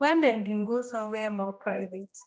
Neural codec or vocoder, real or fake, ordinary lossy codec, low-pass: codec, 16 kHz, 1 kbps, X-Codec, HuBERT features, trained on general audio; fake; none; none